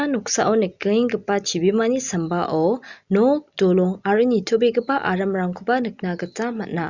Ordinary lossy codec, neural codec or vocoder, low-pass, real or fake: Opus, 64 kbps; none; 7.2 kHz; real